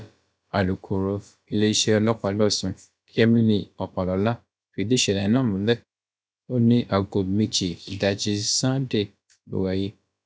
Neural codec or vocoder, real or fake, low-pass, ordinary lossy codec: codec, 16 kHz, about 1 kbps, DyCAST, with the encoder's durations; fake; none; none